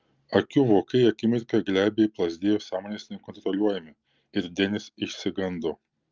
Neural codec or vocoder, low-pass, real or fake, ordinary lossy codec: none; 7.2 kHz; real; Opus, 24 kbps